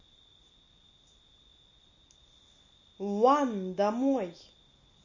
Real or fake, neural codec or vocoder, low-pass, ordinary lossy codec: real; none; 7.2 kHz; MP3, 32 kbps